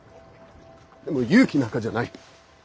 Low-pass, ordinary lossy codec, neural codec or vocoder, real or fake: none; none; none; real